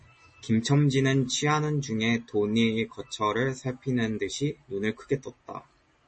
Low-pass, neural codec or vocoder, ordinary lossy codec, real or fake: 9.9 kHz; none; MP3, 32 kbps; real